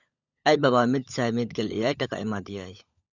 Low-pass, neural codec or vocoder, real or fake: 7.2 kHz; codec, 16 kHz, 16 kbps, FunCodec, trained on LibriTTS, 50 frames a second; fake